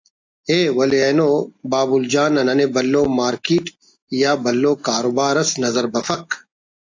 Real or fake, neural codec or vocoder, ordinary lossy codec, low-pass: real; none; AAC, 48 kbps; 7.2 kHz